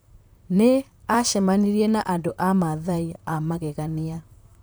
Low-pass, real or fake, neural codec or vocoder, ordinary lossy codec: none; fake; vocoder, 44.1 kHz, 128 mel bands, Pupu-Vocoder; none